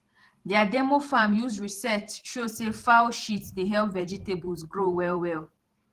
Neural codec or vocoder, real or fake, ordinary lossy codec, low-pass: vocoder, 44.1 kHz, 128 mel bands every 512 samples, BigVGAN v2; fake; Opus, 16 kbps; 14.4 kHz